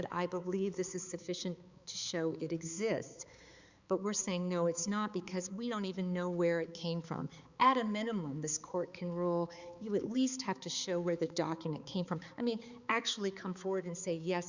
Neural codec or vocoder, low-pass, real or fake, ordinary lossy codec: codec, 16 kHz, 4 kbps, X-Codec, HuBERT features, trained on balanced general audio; 7.2 kHz; fake; Opus, 64 kbps